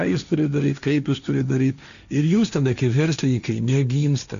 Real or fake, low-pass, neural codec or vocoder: fake; 7.2 kHz; codec, 16 kHz, 1.1 kbps, Voila-Tokenizer